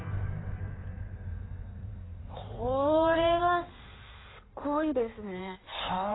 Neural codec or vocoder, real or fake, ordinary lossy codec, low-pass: codec, 16 kHz in and 24 kHz out, 1.1 kbps, FireRedTTS-2 codec; fake; AAC, 16 kbps; 7.2 kHz